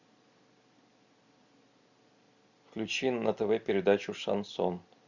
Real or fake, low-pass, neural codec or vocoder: real; 7.2 kHz; none